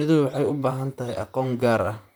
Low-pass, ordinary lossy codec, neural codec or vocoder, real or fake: none; none; vocoder, 44.1 kHz, 128 mel bands, Pupu-Vocoder; fake